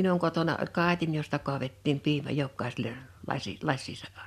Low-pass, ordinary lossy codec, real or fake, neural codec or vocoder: 14.4 kHz; MP3, 96 kbps; real; none